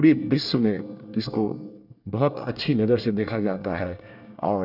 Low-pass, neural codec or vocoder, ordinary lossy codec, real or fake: 5.4 kHz; codec, 24 kHz, 1 kbps, SNAC; none; fake